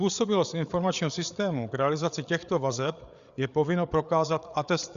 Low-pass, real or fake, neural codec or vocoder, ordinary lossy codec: 7.2 kHz; fake; codec, 16 kHz, 8 kbps, FreqCodec, larger model; Opus, 64 kbps